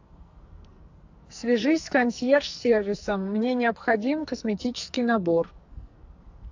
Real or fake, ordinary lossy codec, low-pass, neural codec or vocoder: fake; AAC, 48 kbps; 7.2 kHz; codec, 44.1 kHz, 2.6 kbps, SNAC